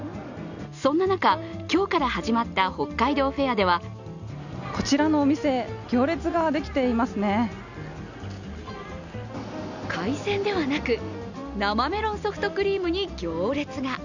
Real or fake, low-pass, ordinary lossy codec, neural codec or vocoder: real; 7.2 kHz; none; none